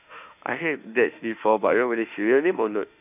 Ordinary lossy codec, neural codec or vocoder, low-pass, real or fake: none; autoencoder, 48 kHz, 32 numbers a frame, DAC-VAE, trained on Japanese speech; 3.6 kHz; fake